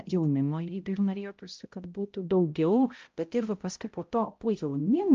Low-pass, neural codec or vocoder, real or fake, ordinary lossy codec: 7.2 kHz; codec, 16 kHz, 0.5 kbps, X-Codec, HuBERT features, trained on balanced general audio; fake; Opus, 24 kbps